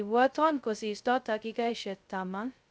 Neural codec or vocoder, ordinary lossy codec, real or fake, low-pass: codec, 16 kHz, 0.2 kbps, FocalCodec; none; fake; none